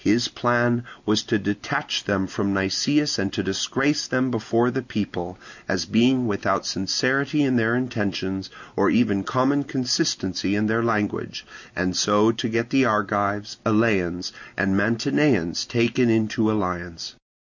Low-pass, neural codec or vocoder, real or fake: 7.2 kHz; none; real